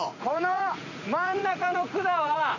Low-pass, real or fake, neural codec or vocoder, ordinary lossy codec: 7.2 kHz; fake; vocoder, 44.1 kHz, 80 mel bands, Vocos; none